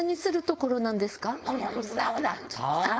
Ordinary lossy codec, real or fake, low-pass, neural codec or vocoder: none; fake; none; codec, 16 kHz, 4.8 kbps, FACodec